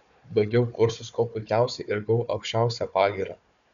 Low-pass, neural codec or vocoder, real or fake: 7.2 kHz; codec, 16 kHz, 4 kbps, FunCodec, trained on Chinese and English, 50 frames a second; fake